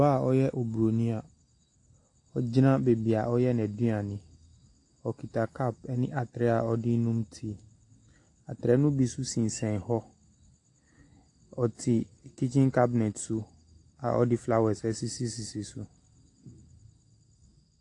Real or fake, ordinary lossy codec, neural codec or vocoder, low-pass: real; AAC, 48 kbps; none; 10.8 kHz